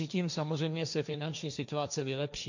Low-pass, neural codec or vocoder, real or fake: 7.2 kHz; codec, 16 kHz, 1.1 kbps, Voila-Tokenizer; fake